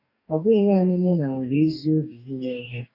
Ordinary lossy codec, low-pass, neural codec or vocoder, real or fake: none; 5.4 kHz; codec, 44.1 kHz, 2.6 kbps, DAC; fake